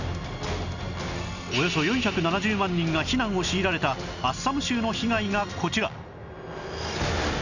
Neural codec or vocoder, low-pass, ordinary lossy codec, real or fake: none; 7.2 kHz; none; real